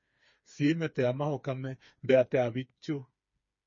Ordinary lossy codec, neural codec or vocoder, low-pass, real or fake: MP3, 32 kbps; codec, 16 kHz, 4 kbps, FreqCodec, smaller model; 7.2 kHz; fake